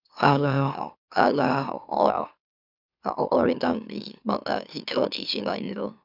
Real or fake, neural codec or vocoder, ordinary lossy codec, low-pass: fake; autoencoder, 44.1 kHz, a latent of 192 numbers a frame, MeloTTS; none; 5.4 kHz